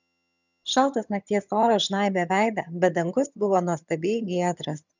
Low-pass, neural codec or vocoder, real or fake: 7.2 kHz; vocoder, 22.05 kHz, 80 mel bands, HiFi-GAN; fake